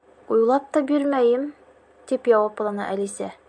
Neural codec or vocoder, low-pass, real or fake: none; 9.9 kHz; real